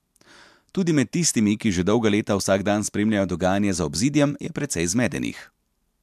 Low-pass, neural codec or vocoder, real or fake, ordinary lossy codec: 14.4 kHz; none; real; MP3, 96 kbps